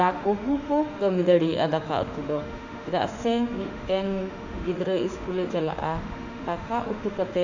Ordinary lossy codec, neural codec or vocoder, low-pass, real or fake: none; autoencoder, 48 kHz, 32 numbers a frame, DAC-VAE, trained on Japanese speech; 7.2 kHz; fake